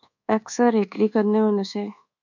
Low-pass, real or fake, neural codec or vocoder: 7.2 kHz; fake; codec, 24 kHz, 1.2 kbps, DualCodec